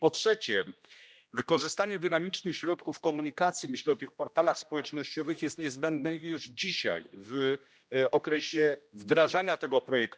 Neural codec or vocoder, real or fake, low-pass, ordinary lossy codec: codec, 16 kHz, 1 kbps, X-Codec, HuBERT features, trained on general audio; fake; none; none